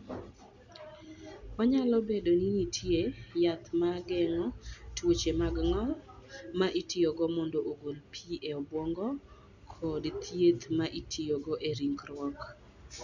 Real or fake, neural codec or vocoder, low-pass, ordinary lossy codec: real; none; 7.2 kHz; none